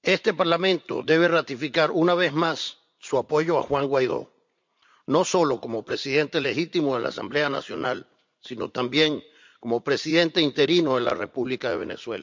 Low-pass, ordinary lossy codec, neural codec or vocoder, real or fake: 7.2 kHz; MP3, 64 kbps; vocoder, 44.1 kHz, 80 mel bands, Vocos; fake